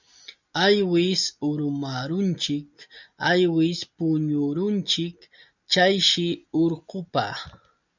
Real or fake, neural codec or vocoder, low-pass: real; none; 7.2 kHz